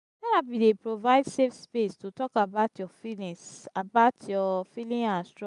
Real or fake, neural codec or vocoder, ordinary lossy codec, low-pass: real; none; none; 10.8 kHz